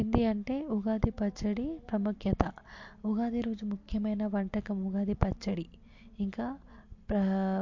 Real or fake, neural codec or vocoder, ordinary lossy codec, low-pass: real; none; MP3, 48 kbps; 7.2 kHz